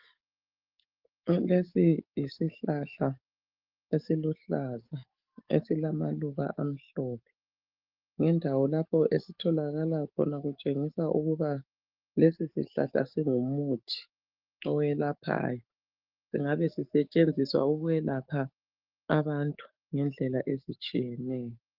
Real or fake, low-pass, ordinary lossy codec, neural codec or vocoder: fake; 5.4 kHz; Opus, 32 kbps; codec, 44.1 kHz, 7.8 kbps, DAC